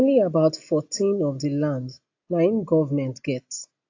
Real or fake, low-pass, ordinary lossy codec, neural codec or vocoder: real; 7.2 kHz; AAC, 48 kbps; none